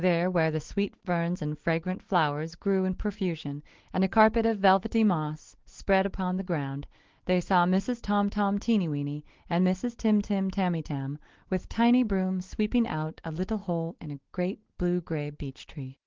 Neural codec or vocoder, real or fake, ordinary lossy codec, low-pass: none; real; Opus, 16 kbps; 7.2 kHz